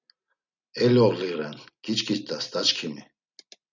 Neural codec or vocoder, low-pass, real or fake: none; 7.2 kHz; real